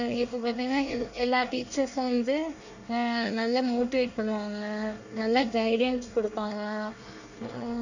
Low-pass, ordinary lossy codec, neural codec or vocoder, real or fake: 7.2 kHz; none; codec, 24 kHz, 1 kbps, SNAC; fake